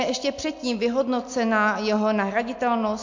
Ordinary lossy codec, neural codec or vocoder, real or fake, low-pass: MP3, 48 kbps; none; real; 7.2 kHz